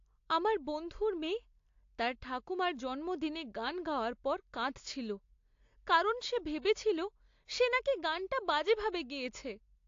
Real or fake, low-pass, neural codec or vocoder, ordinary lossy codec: real; 7.2 kHz; none; AAC, 48 kbps